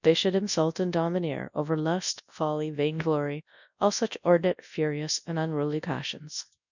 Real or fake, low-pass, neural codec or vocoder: fake; 7.2 kHz; codec, 24 kHz, 0.9 kbps, WavTokenizer, large speech release